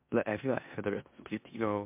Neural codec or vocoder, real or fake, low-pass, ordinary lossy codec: codec, 16 kHz in and 24 kHz out, 0.9 kbps, LongCat-Audio-Codec, four codebook decoder; fake; 3.6 kHz; MP3, 32 kbps